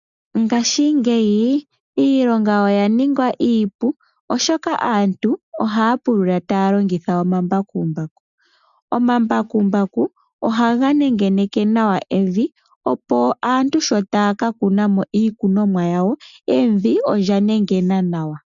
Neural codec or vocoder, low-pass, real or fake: none; 7.2 kHz; real